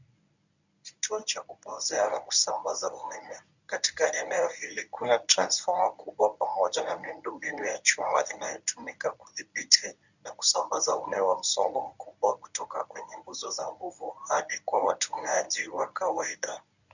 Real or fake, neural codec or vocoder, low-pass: fake; codec, 24 kHz, 0.9 kbps, WavTokenizer, medium speech release version 1; 7.2 kHz